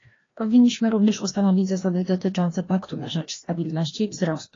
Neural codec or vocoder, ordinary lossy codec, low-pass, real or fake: codec, 16 kHz, 1 kbps, FreqCodec, larger model; AAC, 32 kbps; 7.2 kHz; fake